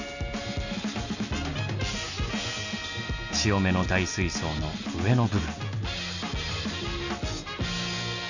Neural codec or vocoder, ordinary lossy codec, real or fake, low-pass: none; none; real; 7.2 kHz